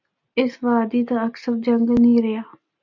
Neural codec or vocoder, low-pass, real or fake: none; 7.2 kHz; real